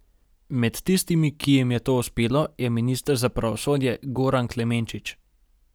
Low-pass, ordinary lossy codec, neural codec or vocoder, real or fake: none; none; none; real